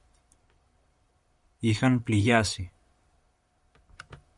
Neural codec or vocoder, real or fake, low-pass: vocoder, 44.1 kHz, 128 mel bands, Pupu-Vocoder; fake; 10.8 kHz